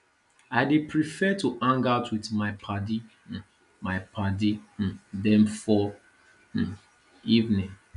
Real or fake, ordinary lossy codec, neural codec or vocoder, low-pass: real; none; none; 10.8 kHz